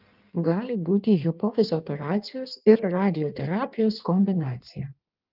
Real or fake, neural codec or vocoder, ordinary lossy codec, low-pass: fake; codec, 16 kHz in and 24 kHz out, 1.1 kbps, FireRedTTS-2 codec; Opus, 32 kbps; 5.4 kHz